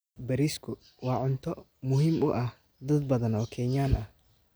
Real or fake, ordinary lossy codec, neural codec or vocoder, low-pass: real; none; none; none